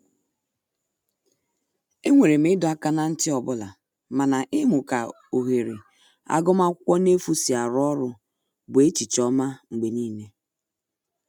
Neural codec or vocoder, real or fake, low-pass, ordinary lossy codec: none; real; 19.8 kHz; none